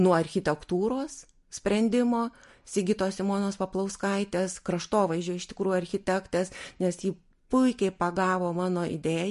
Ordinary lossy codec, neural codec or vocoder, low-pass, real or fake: MP3, 48 kbps; none; 14.4 kHz; real